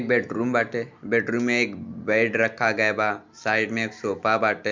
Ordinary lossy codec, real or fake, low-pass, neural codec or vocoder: MP3, 64 kbps; real; 7.2 kHz; none